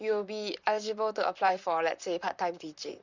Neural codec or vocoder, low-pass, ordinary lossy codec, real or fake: vocoder, 44.1 kHz, 128 mel bands, Pupu-Vocoder; 7.2 kHz; Opus, 64 kbps; fake